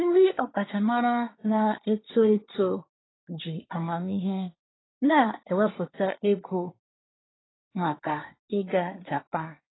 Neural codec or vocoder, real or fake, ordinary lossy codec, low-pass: codec, 24 kHz, 1 kbps, SNAC; fake; AAC, 16 kbps; 7.2 kHz